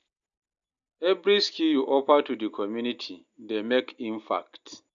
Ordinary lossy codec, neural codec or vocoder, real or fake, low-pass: MP3, 64 kbps; none; real; 7.2 kHz